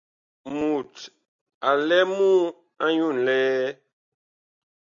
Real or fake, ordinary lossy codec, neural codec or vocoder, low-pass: real; MP3, 64 kbps; none; 7.2 kHz